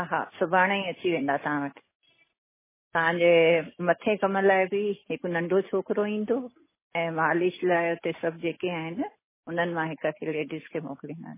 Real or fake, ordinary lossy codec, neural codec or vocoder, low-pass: real; MP3, 16 kbps; none; 3.6 kHz